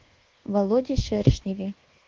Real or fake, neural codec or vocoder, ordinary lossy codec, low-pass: fake; codec, 24 kHz, 1.2 kbps, DualCodec; Opus, 16 kbps; 7.2 kHz